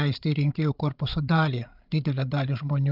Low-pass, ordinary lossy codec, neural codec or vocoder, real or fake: 5.4 kHz; Opus, 32 kbps; codec, 16 kHz, 16 kbps, FreqCodec, larger model; fake